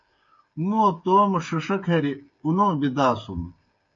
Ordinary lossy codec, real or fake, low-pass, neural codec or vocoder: MP3, 48 kbps; fake; 7.2 kHz; codec, 16 kHz, 8 kbps, FreqCodec, smaller model